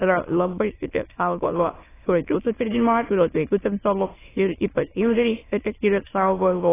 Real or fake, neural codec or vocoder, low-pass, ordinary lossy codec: fake; autoencoder, 22.05 kHz, a latent of 192 numbers a frame, VITS, trained on many speakers; 3.6 kHz; AAC, 16 kbps